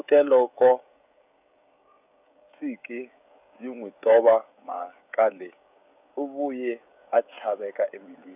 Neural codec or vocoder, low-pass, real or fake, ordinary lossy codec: codec, 16 kHz, 16 kbps, FreqCodec, smaller model; 3.6 kHz; fake; none